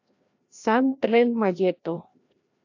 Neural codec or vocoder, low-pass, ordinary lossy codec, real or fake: codec, 16 kHz, 1 kbps, FreqCodec, larger model; 7.2 kHz; AAC, 48 kbps; fake